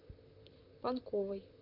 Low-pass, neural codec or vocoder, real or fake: 5.4 kHz; vocoder, 44.1 kHz, 128 mel bands, Pupu-Vocoder; fake